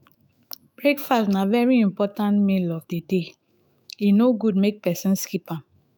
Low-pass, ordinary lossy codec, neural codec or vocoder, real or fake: none; none; autoencoder, 48 kHz, 128 numbers a frame, DAC-VAE, trained on Japanese speech; fake